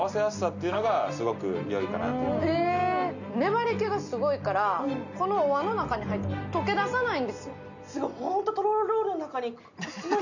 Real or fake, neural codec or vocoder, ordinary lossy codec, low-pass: real; none; none; 7.2 kHz